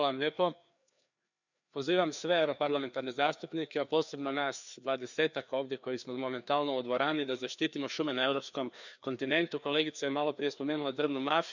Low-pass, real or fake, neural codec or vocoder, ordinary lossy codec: 7.2 kHz; fake; codec, 16 kHz, 2 kbps, FreqCodec, larger model; none